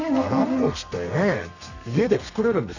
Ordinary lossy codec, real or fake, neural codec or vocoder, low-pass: none; fake; codec, 32 kHz, 1.9 kbps, SNAC; 7.2 kHz